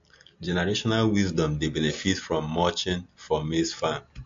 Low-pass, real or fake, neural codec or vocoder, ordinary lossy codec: 7.2 kHz; real; none; MP3, 48 kbps